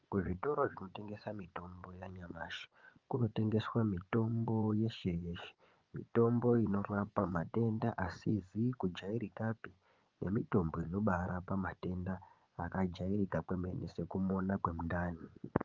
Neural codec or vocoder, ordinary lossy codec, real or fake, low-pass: vocoder, 24 kHz, 100 mel bands, Vocos; Opus, 24 kbps; fake; 7.2 kHz